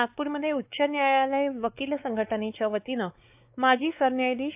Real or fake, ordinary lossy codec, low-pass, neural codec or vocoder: fake; AAC, 32 kbps; 3.6 kHz; codec, 16 kHz, 4 kbps, X-Codec, WavLM features, trained on Multilingual LibriSpeech